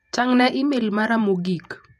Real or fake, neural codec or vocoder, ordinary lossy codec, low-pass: fake; vocoder, 48 kHz, 128 mel bands, Vocos; none; 14.4 kHz